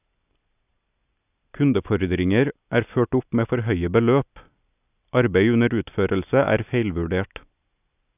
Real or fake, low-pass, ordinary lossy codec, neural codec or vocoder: real; 3.6 kHz; none; none